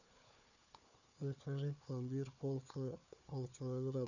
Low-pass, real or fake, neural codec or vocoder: 7.2 kHz; fake; codec, 16 kHz, 4 kbps, FunCodec, trained on Chinese and English, 50 frames a second